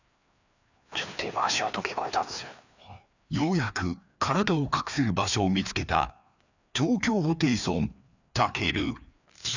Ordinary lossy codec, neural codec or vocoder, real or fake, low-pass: none; codec, 16 kHz, 2 kbps, FreqCodec, larger model; fake; 7.2 kHz